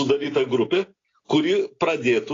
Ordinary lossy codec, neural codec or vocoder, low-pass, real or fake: AAC, 32 kbps; none; 7.2 kHz; real